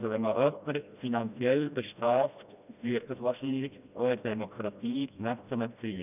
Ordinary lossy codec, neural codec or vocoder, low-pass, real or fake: none; codec, 16 kHz, 1 kbps, FreqCodec, smaller model; 3.6 kHz; fake